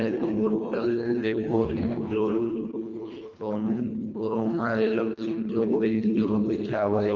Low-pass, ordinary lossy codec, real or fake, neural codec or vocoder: 7.2 kHz; Opus, 32 kbps; fake; codec, 24 kHz, 1.5 kbps, HILCodec